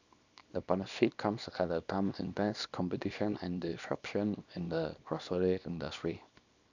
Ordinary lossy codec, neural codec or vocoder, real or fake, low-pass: none; codec, 24 kHz, 0.9 kbps, WavTokenizer, small release; fake; 7.2 kHz